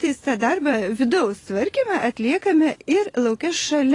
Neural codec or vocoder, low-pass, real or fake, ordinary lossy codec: vocoder, 24 kHz, 100 mel bands, Vocos; 10.8 kHz; fake; AAC, 32 kbps